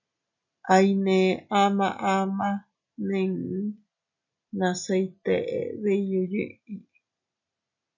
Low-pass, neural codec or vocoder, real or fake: 7.2 kHz; none; real